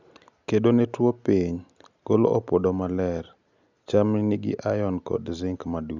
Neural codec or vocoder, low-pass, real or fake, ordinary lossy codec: none; 7.2 kHz; real; none